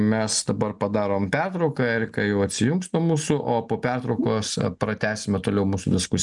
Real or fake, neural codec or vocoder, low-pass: real; none; 10.8 kHz